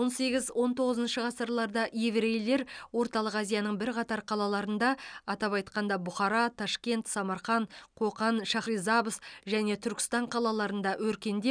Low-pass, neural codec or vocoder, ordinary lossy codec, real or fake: 9.9 kHz; none; none; real